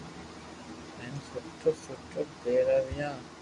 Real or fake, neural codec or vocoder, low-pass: real; none; 10.8 kHz